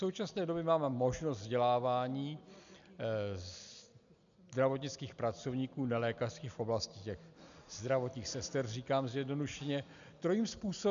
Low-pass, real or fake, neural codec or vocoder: 7.2 kHz; real; none